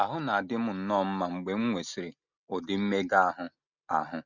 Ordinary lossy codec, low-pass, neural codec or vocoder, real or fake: none; 7.2 kHz; none; real